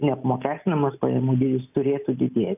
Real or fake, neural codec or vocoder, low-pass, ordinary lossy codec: real; none; 3.6 kHz; AAC, 32 kbps